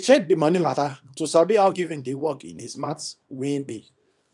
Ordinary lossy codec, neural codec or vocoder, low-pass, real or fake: none; codec, 24 kHz, 0.9 kbps, WavTokenizer, small release; 10.8 kHz; fake